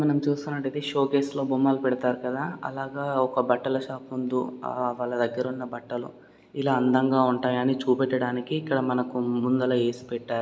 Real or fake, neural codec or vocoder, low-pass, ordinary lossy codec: real; none; none; none